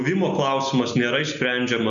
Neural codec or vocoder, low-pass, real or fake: none; 7.2 kHz; real